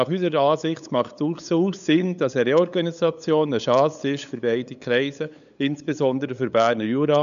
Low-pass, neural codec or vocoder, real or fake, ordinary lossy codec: 7.2 kHz; codec, 16 kHz, 8 kbps, FunCodec, trained on LibriTTS, 25 frames a second; fake; none